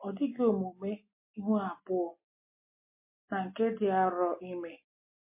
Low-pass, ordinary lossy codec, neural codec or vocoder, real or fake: 3.6 kHz; MP3, 24 kbps; none; real